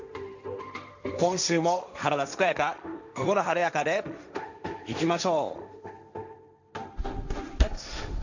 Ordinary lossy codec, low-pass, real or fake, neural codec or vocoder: none; 7.2 kHz; fake; codec, 16 kHz, 1.1 kbps, Voila-Tokenizer